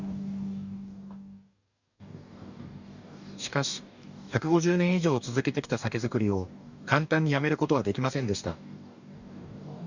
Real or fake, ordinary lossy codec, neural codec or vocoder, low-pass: fake; none; codec, 44.1 kHz, 2.6 kbps, DAC; 7.2 kHz